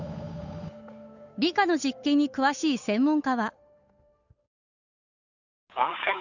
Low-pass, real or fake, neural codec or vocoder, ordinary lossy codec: 7.2 kHz; fake; codec, 16 kHz, 8 kbps, FunCodec, trained on Chinese and English, 25 frames a second; none